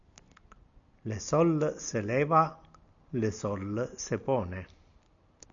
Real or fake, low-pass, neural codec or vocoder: real; 7.2 kHz; none